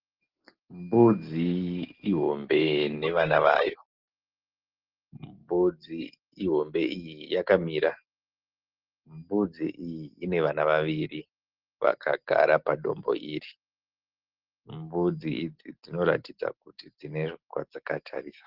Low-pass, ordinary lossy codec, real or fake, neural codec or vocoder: 5.4 kHz; Opus, 16 kbps; real; none